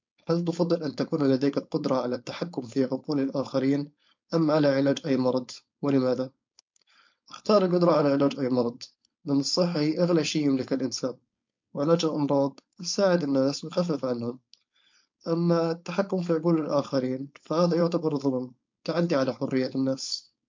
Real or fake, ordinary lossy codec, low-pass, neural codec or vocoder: fake; MP3, 48 kbps; 7.2 kHz; codec, 16 kHz, 4.8 kbps, FACodec